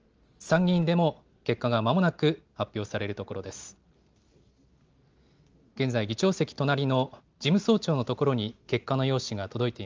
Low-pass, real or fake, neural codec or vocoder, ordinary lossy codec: 7.2 kHz; real; none; Opus, 24 kbps